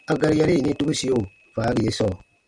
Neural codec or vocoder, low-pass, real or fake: none; 9.9 kHz; real